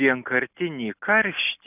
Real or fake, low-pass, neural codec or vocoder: real; 3.6 kHz; none